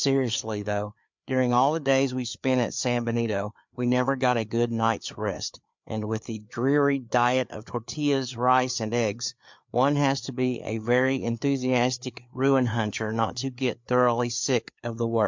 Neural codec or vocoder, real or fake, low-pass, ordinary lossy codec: codec, 16 kHz, 4 kbps, FreqCodec, larger model; fake; 7.2 kHz; MP3, 64 kbps